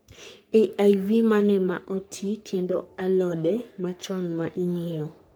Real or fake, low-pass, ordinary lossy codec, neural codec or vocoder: fake; none; none; codec, 44.1 kHz, 3.4 kbps, Pupu-Codec